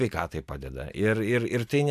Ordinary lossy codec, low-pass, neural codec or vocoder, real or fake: MP3, 96 kbps; 14.4 kHz; none; real